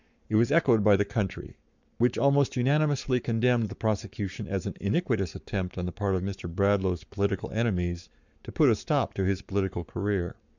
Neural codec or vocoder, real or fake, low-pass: codec, 44.1 kHz, 7.8 kbps, Pupu-Codec; fake; 7.2 kHz